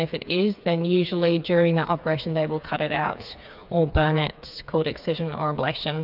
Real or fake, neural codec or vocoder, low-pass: fake; codec, 16 kHz, 4 kbps, FreqCodec, smaller model; 5.4 kHz